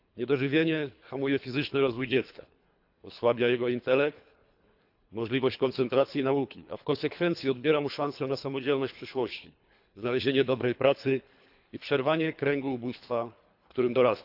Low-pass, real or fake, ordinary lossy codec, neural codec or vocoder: 5.4 kHz; fake; AAC, 48 kbps; codec, 24 kHz, 3 kbps, HILCodec